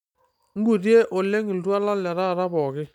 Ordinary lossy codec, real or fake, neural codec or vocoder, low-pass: none; real; none; 19.8 kHz